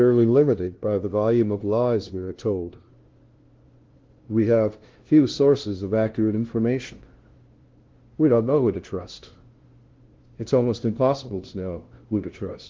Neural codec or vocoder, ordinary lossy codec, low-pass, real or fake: codec, 16 kHz, 0.5 kbps, FunCodec, trained on LibriTTS, 25 frames a second; Opus, 16 kbps; 7.2 kHz; fake